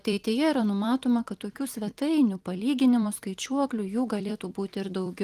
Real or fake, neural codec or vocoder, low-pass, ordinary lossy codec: fake; vocoder, 44.1 kHz, 128 mel bands every 256 samples, BigVGAN v2; 14.4 kHz; Opus, 24 kbps